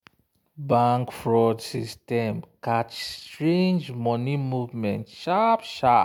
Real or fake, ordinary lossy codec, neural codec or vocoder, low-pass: real; MP3, 96 kbps; none; 19.8 kHz